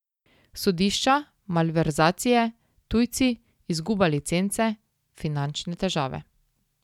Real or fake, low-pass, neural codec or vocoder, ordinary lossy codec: real; 19.8 kHz; none; none